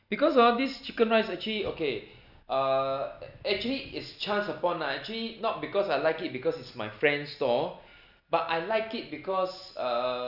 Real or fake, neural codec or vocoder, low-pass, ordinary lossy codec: real; none; 5.4 kHz; none